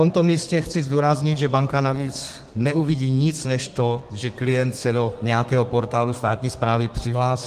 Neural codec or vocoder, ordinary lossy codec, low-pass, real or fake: codec, 32 kHz, 1.9 kbps, SNAC; Opus, 24 kbps; 14.4 kHz; fake